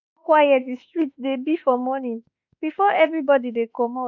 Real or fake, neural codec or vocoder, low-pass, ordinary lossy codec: fake; autoencoder, 48 kHz, 32 numbers a frame, DAC-VAE, trained on Japanese speech; 7.2 kHz; none